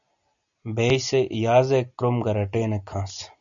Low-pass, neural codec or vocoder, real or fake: 7.2 kHz; none; real